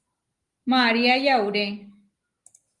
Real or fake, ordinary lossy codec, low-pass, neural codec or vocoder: real; Opus, 24 kbps; 10.8 kHz; none